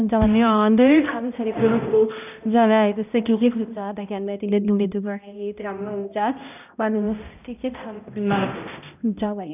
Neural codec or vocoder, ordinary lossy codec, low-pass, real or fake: codec, 16 kHz, 0.5 kbps, X-Codec, HuBERT features, trained on balanced general audio; none; 3.6 kHz; fake